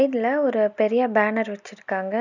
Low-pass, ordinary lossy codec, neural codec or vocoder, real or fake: 7.2 kHz; none; none; real